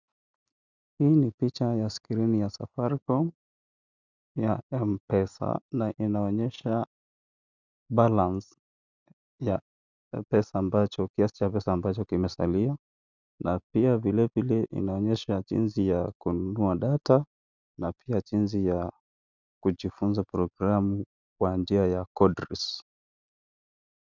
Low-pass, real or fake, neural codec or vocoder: 7.2 kHz; real; none